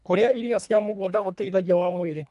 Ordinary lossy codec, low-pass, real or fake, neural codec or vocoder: none; 10.8 kHz; fake; codec, 24 kHz, 1.5 kbps, HILCodec